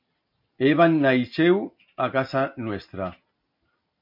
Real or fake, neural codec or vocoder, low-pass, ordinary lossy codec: real; none; 5.4 kHz; MP3, 48 kbps